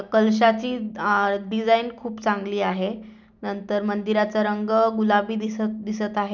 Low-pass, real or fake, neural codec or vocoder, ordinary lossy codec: 7.2 kHz; real; none; none